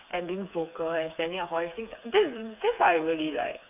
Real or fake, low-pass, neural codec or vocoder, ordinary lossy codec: fake; 3.6 kHz; codec, 16 kHz, 4 kbps, FreqCodec, smaller model; none